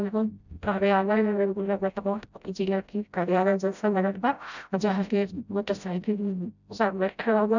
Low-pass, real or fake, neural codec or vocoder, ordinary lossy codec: 7.2 kHz; fake; codec, 16 kHz, 0.5 kbps, FreqCodec, smaller model; none